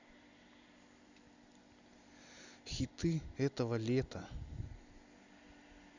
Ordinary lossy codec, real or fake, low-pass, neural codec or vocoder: Opus, 64 kbps; real; 7.2 kHz; none